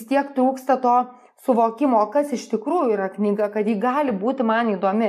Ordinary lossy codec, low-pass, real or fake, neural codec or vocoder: MP3, 64 kbps; 14.4 kHz; real; none